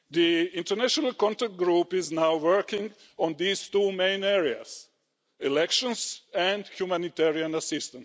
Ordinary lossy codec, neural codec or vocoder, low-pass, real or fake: none; none; none; real